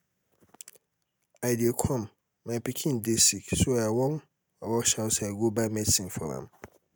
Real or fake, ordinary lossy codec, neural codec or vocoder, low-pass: real; none; none; none